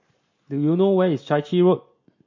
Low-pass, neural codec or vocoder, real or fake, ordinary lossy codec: 7.2 kHz; none; real; MP3, 32 kbps